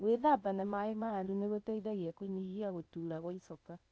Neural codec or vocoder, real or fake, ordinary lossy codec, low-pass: codec, 16 kHz, 0.8 kbps, ZipCodec; fake; none; none